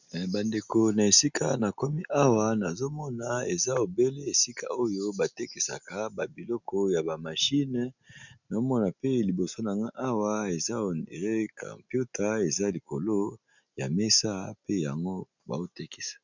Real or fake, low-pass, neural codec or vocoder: real; 7.2 kHz; none